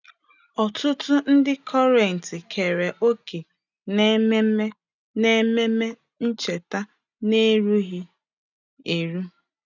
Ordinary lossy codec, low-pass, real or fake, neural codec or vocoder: none; 7.2 kHz; real; none